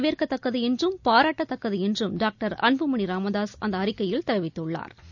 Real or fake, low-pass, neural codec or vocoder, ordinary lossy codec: real; 7.2 kHz; none; none